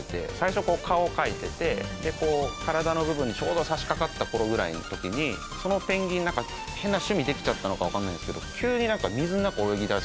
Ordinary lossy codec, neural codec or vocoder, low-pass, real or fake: none; none; none; real